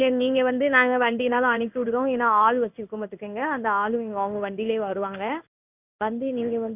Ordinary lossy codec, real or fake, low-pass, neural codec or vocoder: none; fake; 3.6 kHz; codec, 16 kHz in and 24 kHz out, 1 kbps, XY-Tokenizer